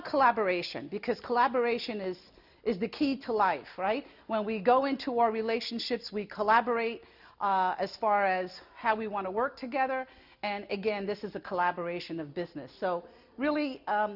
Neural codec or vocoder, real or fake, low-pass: none; real; 5.4 kHz